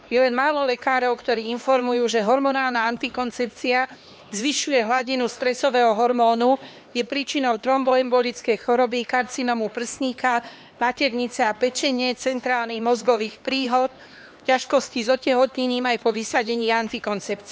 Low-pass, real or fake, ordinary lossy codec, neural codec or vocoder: none; fake; none; codec, 16 kHz, 2 kbps, X-Codec, HuBERT features, trained on LibriSpeech